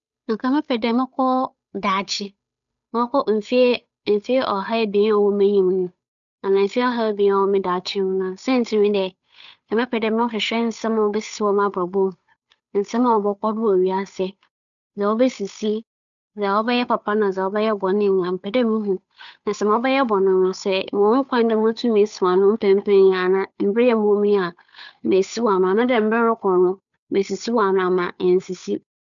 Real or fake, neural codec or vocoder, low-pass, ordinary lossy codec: fake; codec, 16 kHz, 8 kbps, FunCodec, trained on Chinese and English, 25 frames a second; 7.2 kHz; none